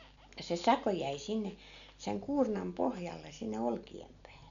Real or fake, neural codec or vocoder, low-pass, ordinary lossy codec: real; none; 7.2 kHz; none